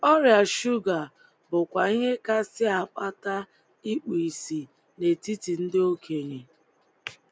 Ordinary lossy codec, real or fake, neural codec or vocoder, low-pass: none; real; none; none